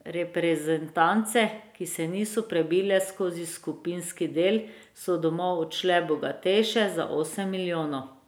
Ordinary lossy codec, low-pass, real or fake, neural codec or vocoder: none; none; real; none